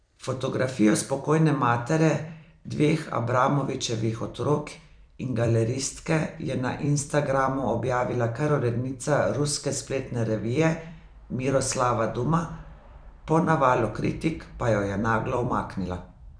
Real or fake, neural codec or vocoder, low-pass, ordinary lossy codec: fake; vocoder, 44.1 kHz, 128 mel bands every 256 samples, BigVGAN v2; 9.9 kHz; none